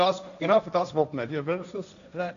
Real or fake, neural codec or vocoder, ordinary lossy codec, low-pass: fake; codec, 16 kHz, 1.1 kbps, Voila-Tokenizer; none; 7.2 kHz